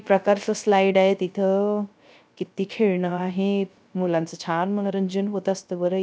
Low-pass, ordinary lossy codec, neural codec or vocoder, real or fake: none; none; codec, 16 kHz, 0.3 kbps, FocalCodec; fake